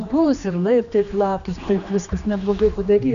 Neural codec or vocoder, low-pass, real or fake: codec, 16 kHz, 2 kbps, X-Codec, HuBERT features, trained on general audio; 7.2 kHz; fake